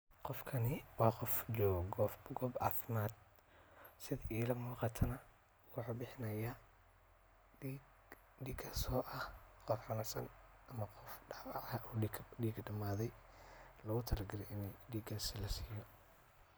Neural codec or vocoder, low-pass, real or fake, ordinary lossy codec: none; none; real; none